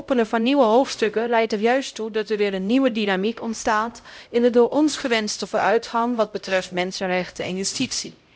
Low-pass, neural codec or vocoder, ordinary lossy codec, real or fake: none; codec, 16 kHz, 0.5 kbps, X-Codec, HuBERT features, trained on LibriSpeech; none; fake